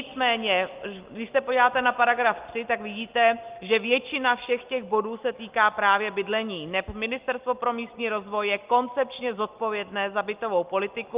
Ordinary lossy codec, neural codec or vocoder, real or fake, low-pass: Opus, 32 kbps; none; real; 3.6 kHz